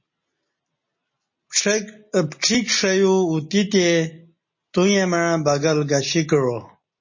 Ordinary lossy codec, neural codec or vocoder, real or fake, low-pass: MP3, 32 kbps; none; real; 7.2 kHz